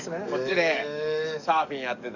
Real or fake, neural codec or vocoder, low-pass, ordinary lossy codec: fake; codec, 44.1 kHz, 7.8 kbps, DAC; 7.2 kHz; none